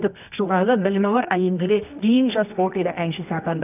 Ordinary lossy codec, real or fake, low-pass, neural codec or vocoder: none; fake; 3.6 kHz; codec, 24 kHz, 0.9 kbps, WavTokenizer, medium music audio release